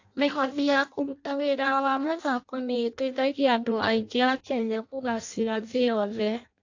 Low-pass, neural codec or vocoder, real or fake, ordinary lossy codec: 7.2 kHz; codec, 16 kHz in and 24 kHz out, 0.6 kbps, FireRedTTS-2 codec; fake; AAC, 48 kbps